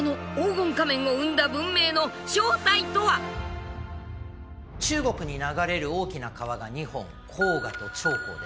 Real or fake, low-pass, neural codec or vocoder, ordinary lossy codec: real; none; none; none